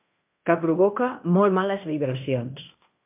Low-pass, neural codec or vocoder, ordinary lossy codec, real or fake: 3.6 kHz; codec, 16 kHz in and 24 kHz out, 0.9 kbps, LongCat-Audio-Codec, fine tuned four codebook decoder; MP3, 32 kbps; fake